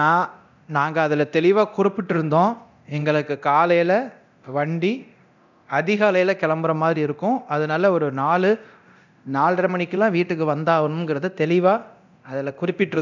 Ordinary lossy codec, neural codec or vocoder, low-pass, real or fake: none; codec, 24 kHz, 0.9 kbps, DualCodec; 7.2 kHz; fake